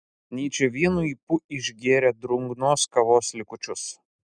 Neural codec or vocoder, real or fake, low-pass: none; real; 9.9 kHz